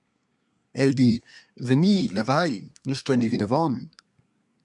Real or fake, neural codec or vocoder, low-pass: fake; codec, 24 kHz, 1 kbps, SNAC; 10.8 kHz